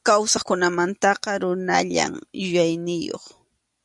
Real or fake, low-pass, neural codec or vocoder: real; 10.8 kHz; none